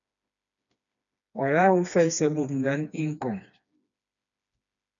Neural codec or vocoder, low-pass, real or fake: codec, 16 kHz, 2 kbps, FreqCodec, smaller model; 7.2 kHz; fake